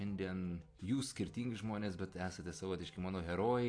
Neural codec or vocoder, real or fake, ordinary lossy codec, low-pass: none; real; AAC, 48 kbps; 9.9 kHz